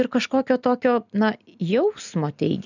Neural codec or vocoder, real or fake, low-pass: none; real; 7.2 kHz